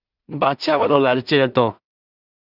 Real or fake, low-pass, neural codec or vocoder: fake; 5.4 kHz; codec, 16 kHz in and 24 kHz out, 0.4 kbps, LongCat-Audio-Codec, two codebook decoder